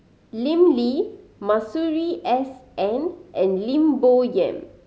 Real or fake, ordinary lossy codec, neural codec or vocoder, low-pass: real; none; none; none